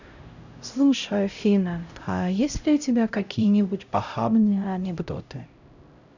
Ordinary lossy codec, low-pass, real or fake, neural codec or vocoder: none; 7.2 kHz; fake; codec, 16 kHz, 0.5 kbps, X-Codec, HuBERT features, trained on LibriSpeech